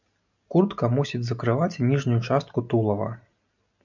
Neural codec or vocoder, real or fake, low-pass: none; real; 7.2 kHz